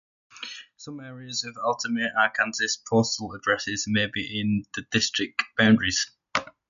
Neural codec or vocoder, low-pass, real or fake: none; 7.2 kHz; real